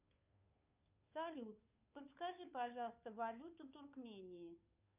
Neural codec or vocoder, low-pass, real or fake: codec, 16 kHz, 2 kbps, FunCodec, trained on Chinese and English, 25 frames a second; 3.6 kHz; fake